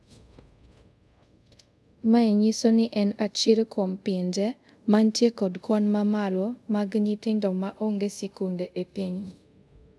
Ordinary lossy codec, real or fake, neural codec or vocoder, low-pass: none; fake; codec, 24 kHz, 0.5 kbps, DualCodec; none